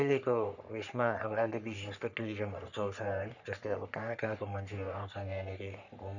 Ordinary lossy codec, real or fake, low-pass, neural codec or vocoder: none; fake; 7.2 kHz; codec, 44.1 kHz, 3.4 kbps, Pupu-Codec